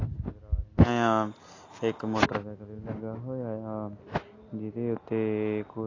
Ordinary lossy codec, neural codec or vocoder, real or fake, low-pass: AAC, 32 kbps; none; real; 7.2 kHz